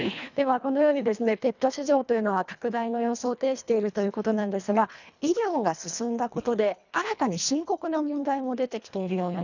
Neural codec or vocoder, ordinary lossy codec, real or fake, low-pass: codec, 24 kHz, 1.5 kbps, HILCodec; none; fake; 7.2 kHz